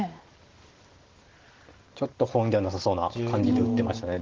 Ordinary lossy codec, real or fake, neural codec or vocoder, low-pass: Opus, 16 kbps; real; none; 7.2 kHz